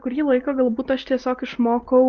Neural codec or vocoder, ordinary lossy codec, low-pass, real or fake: none; Opus, 32 kbps; 7.2 kHz; real